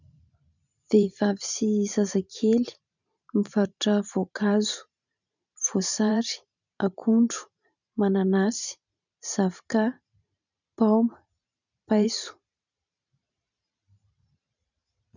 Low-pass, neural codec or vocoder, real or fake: 7.2 kHz; vocoder, 44.1 kHz, 128 mel bands every 512 samples, BigVGAN v2; fake